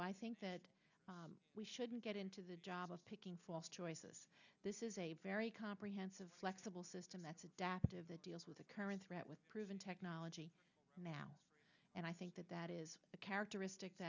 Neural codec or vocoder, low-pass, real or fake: none; 7.2 kHz; real